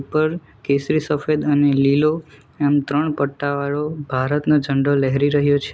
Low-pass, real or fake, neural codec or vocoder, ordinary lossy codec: none; real; none; none